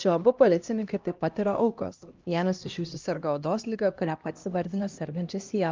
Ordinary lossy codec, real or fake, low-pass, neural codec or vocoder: Opus, 24 kbps; fake; 7.2 kHz; codec, 16 kHz, 1 kbps, X-Codec, HuBERT features, trained on LibriSpeech